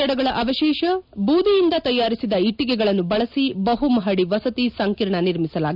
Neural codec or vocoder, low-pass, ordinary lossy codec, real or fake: none; 5.4 kHz; none; real